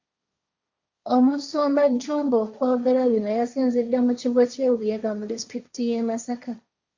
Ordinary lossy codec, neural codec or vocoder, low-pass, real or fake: Opus, 64 kbps; codec, 16 kHz, 1.1 kbps, Voila-Tokenizer; 7.2 kHz; fake